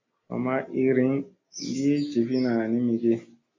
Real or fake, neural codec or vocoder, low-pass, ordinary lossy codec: real; none; 7.2 kHz; AAC, 32 kbps